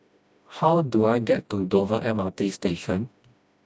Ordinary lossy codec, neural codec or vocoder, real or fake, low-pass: none; codec, 16 kHz, 1 kbps, FreqCodec, smaller model; fake; none